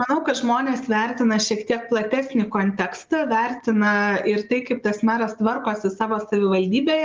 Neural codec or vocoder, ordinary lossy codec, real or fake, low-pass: none; Opus, 24 kbps; real; 7.2 kHz